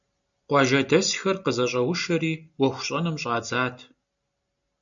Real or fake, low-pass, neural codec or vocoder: real; 7.2 kHz; none